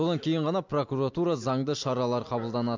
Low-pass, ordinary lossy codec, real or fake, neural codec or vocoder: 7.2 kHz; MP3, 64 kbps; real; none